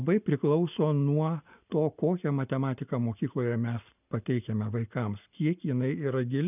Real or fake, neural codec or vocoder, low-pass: real; none; 3.6 kHz